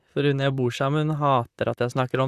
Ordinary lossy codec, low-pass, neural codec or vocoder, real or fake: Opus, 64 kbps; 14.4 kHz; vocoder, 44.1 kHz, 128 mel bands, Pupu-Vocoder; fake